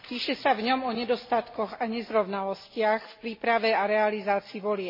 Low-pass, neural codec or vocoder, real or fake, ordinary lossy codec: 5.4 kHz; none; real; MP3, 24 kbps